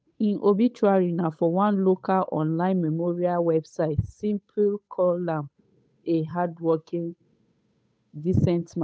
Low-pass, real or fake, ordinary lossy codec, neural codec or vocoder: none; fake; none; codec, 16 kHz, 8 kbps, FunCodec, trained on Chinese and English, 25 frames a second